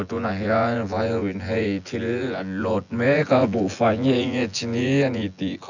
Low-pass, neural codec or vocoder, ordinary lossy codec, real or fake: 7.2 kHz; vocoder, 24 kHz, 100 mel bands, Vocos; none; fake